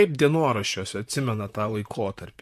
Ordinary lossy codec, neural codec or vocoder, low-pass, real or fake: MP3, 64 kbps; vocoder, 44.1 kHz, 128 mel bands, Pupu-Vocoder; 14.4 kHz; fake